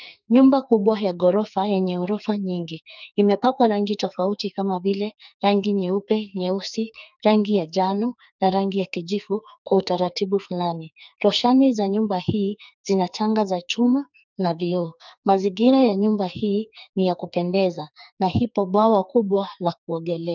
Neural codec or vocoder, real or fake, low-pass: codec, 44.1 kHz, 2.6 kbps, SNAC; fake; 7.2 kHz